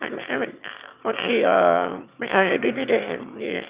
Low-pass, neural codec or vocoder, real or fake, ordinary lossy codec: 3.6 kHz; autoencoder, 22.05 kHz, a latent of 192 numbers a frame, VITS, trained on one speaker; fake; Opus, 64 kbps